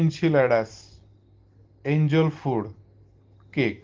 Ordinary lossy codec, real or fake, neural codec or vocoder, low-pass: Opus, 16 kbps; real; none; 7.2 kHz